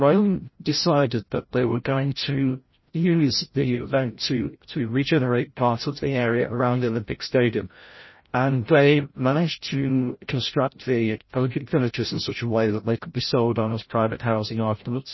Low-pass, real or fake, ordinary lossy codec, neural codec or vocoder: 7.2 kHz; fake; MP3, 24 kbps; codec, 16 kHz, 0.5 kbps, FreqCodec, larger model